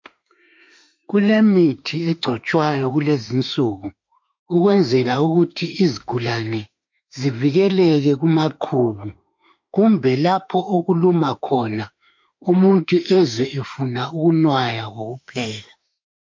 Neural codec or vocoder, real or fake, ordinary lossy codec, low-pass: autoencoder, 48 kHz, 32 numbers a frame, DAC-VAE, trained on Japanese speech; fake; MP3, 48 kbps; 7.2 kHz